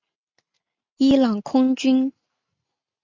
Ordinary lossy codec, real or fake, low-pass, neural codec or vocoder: MP3, 64 kbps; real; 7.2 kHz; none